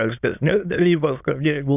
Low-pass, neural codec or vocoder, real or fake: 3.6 kHz; autoencoder, 22.05 kHz, a latent of 192 numbers a frame, VITS, trained on many speakers; fake